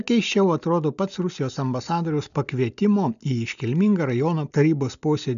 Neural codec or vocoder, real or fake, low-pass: none; real; 7.2 kHz